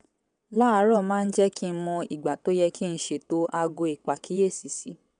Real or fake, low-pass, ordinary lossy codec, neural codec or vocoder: fake; 9.9 kHz; none; vocoder, 22.05 kHz, 80 mel bands, WaveNeXt